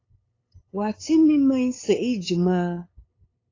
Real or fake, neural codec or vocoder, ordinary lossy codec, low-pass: fake; codec, 16 kHz, 8 kbps, FunCodec, trained on LibriTTS, 25 frames a second; AAC, 32 kbps; 7.2 kHz